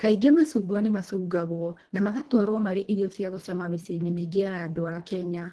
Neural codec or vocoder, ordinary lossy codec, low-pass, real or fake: codec, 24 kHz, 1.5 kbps, HILCodec; Opus, 16 kbps; 10.8 kHz; fake